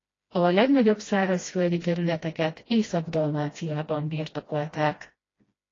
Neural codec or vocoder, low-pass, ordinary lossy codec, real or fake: codec, 16 kHz, 1 kbps, FreqCodec, smaller model; 7.2 kHz; AAC, 32 kbps; fake